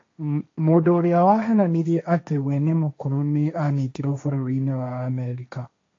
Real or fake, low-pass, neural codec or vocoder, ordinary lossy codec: fake; 7.2 kHz; codec, 16 kHz, 1.1 kbps, Voila-Tokenizer; AAC, 48 kbps